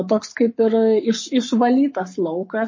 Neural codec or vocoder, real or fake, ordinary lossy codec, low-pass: codec, 44.1 kHz, 7.8 kbps, Pupu-Codec; fake; MP3, 32 kbps; 7.2 kHz